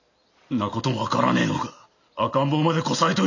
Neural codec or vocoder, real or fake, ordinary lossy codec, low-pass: none; real; none; 7.2 kHz